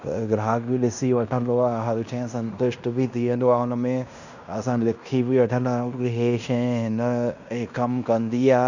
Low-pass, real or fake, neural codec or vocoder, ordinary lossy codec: 7.2 kHz; fake; codec, 16 kHz in and 24 kHz out, 0.9 kbps, LongCat-Audio-Codec, fine tuned four codebook decoder; none